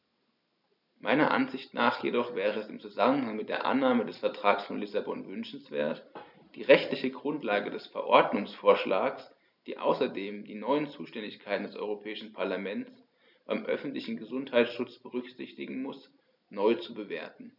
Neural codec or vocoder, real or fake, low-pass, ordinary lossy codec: none; real; 5.4 kHz; none